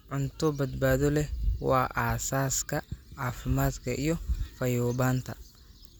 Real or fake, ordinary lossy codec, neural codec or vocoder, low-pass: real; none; none; none